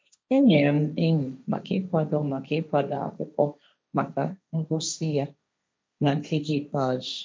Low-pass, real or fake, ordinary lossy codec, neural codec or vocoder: none; fake; none; codec, 16 kHz, 1.1 kbps, Voila-Tokenizer